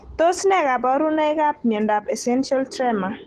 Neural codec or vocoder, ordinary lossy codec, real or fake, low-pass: none; Opus, 16 kbps; real; 14.4 kHz